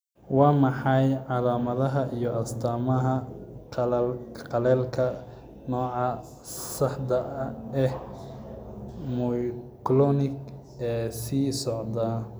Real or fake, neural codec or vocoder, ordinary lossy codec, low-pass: real; none; none; none